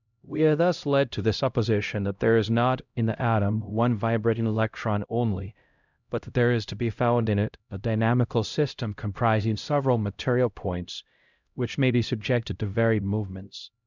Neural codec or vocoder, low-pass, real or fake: codec, 16 kHz, 0.5 kbps, X-Codec, HuBERT features, trained on LibriSpeech; 7.2 kHz; fake